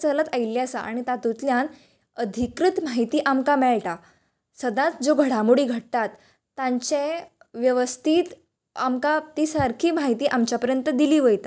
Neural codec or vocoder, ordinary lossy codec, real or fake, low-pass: none; none; real; none